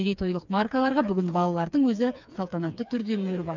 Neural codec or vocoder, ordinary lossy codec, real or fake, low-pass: codec, 16 kHz, 4 kbps, FreqCodec, smaller model; none; fake; 7.2 kHz